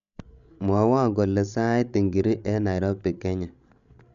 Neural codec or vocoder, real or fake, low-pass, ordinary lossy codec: codec, 16 kHz, 16 kbps, FreqCodec, larger model; fake; 7.2 kHz; none